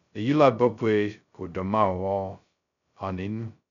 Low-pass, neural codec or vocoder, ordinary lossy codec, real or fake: 7.2 kHz; codec, 16 kHz, 0.2 kbps, FocalCodec; none; fake